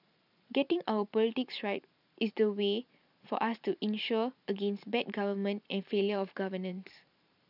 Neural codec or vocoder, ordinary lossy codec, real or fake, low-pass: vocoder, 44.1 kHz, 128 mel bands every 512 samples, BigVGAN v2; none; fake; 5.4 kHz